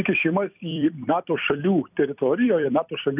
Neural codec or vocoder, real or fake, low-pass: vocoder, 44.1 kHz, 128 mel bands every 256 samples, BigVGAN v2; fake; 3.6 kHz